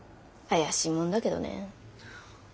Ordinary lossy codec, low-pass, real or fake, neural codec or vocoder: none; none; real; none